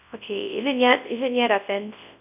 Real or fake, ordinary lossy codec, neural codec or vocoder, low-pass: fake; none; codec, 24 kHz, 0.9 kbps, WavTokenizer, large speech release; 3.6 kHz